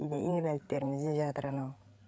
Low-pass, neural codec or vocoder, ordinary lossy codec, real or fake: none; codec, 16 kHz, 8 kbps, FreqCodec, larger model; none; fake